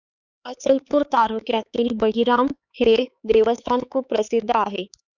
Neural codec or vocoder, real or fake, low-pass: codec, 16 kHz, 4 kbps, X-Codec, HuBERT features, trained on LibriSpeech; fake; 7.2 kHz